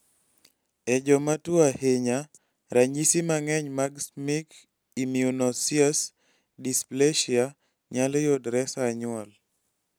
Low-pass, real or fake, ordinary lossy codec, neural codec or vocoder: none; real; none; none